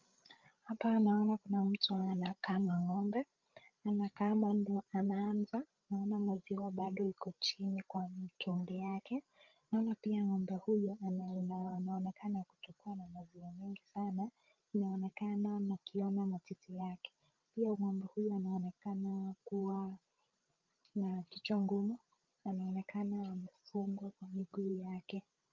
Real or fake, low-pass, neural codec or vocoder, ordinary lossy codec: fake; 7.2 kHz; codec, 16 kHz, 16 kbps, FreqCodec, larger model; Opus, 24 kbps